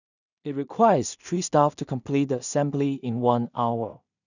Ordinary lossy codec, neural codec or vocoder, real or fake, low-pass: none; codec, 16 kHz in and 24 kHz out, 0.4 kbps, LongCat-Audio-Codec, two codebook decoder; fake; 7.2 kHz